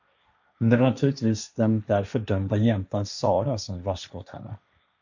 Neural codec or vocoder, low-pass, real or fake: codec, 16 kHz, 1.1 kbps, Voila-Tokenizer; 7.2 kHz; fake